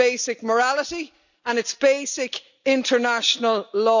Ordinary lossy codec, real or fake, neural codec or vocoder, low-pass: none; real; none; 7.2 kHz